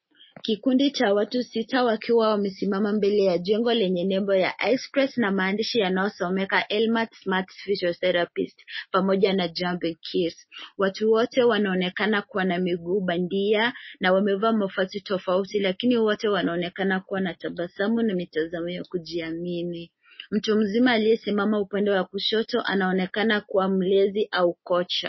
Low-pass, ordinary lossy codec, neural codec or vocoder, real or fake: 7.2 kHz; MP3, 24 kbps; none; real